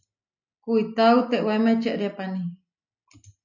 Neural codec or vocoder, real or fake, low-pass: none; real; 7.2 kHz